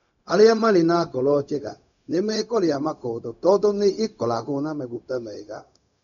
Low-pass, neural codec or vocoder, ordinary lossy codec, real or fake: 7.2 kHz; codec, 16 kHz, 0.4 kbps, LongCat-Audio-Codec; none; fake